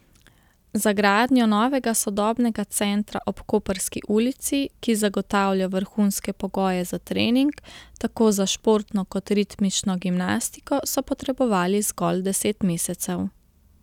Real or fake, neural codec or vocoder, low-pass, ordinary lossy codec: real; none; 19.8 kHz; none